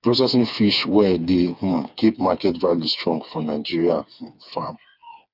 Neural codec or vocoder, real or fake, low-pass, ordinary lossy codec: codec, 16 kHz, 4 kbps, FreqCodec, smaller model; fake; 5.4 kHz; none